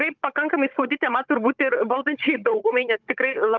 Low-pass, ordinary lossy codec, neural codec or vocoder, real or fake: 7.2 kHz; Opus, 24 kbps; codec, 16 kHz, 16 kbps, FunCodec, trained on Chinese and English, 50 frames a second; fake